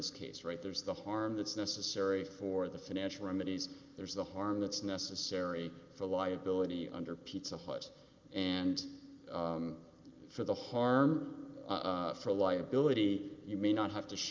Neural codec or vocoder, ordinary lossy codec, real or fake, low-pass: none; Opus, 24 kbps; real; 7.2 kHz